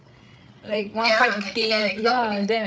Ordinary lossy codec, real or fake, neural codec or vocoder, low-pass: none; fake; codec, 16 kHz, 8 kbps, FreqCodec, larger model; none